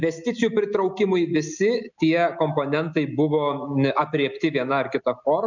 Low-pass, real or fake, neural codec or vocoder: 7.2 kHz; real; none